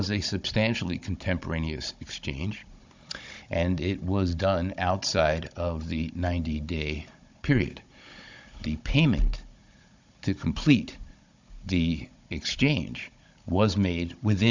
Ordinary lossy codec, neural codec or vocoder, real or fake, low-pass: MP3, 64 kbps; codec, 16 kHz, 16 kbps, FunCodec, trained on Chinese and English, 50 frames a second; fake; 7.2 kHz